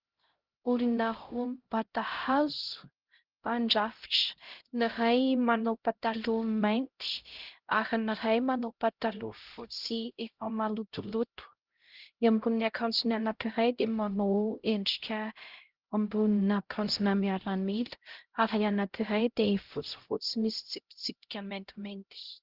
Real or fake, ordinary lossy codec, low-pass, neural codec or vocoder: fake; Opus, 16 kbps; 5.4 kHz; codec, 16 kHz, 0.5 kbps, X-Codec, HuBERT features, trained on LibriSpeech